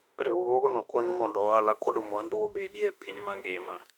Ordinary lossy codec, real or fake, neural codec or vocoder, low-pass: none; fake; autoencoder, 48 kHz, 32 numbers a frame, DAC-VAE, trained on Japanese speech; 19.8 kHz